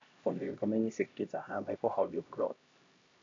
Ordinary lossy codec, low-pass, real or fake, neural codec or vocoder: AAC, 64 kbps; 7.2 kHz; fake; codec, 16 kHz, 1 kbps, X-Codec, HuBERT features, trained on LibriSpeech